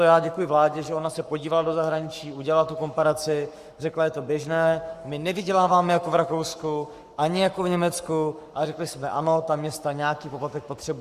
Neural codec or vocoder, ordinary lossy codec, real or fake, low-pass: codec, 44.1 kHz, 7.8 kbps, Pupu-Codec; Opus, 64 kbps; fake; 14.4 kHz